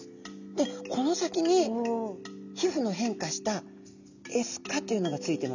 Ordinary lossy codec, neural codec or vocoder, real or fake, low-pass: none; none; real; 7.2 kHz